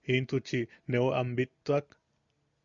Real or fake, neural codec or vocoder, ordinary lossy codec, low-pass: real; none; Opus, 64 kbps; 7.2 kHz